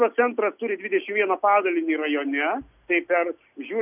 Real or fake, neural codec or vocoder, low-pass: real; none; 3.6 kHz